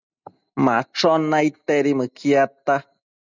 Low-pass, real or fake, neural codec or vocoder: 7.2 kHz; real; none